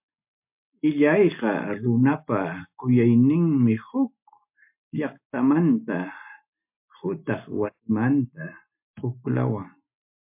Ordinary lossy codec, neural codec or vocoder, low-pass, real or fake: MP3, 32 kbps; none; 3.6 kHz; real